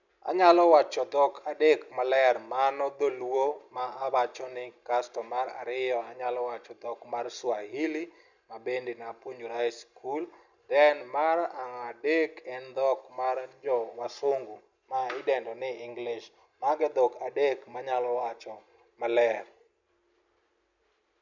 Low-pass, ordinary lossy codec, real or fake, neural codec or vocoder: 7.2 kHz; none; real; none